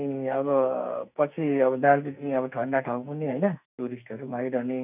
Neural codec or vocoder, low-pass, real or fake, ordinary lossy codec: codec, 44.1 kHz, 2.6 kbps, SNAC; 3.6 kHz; fake; none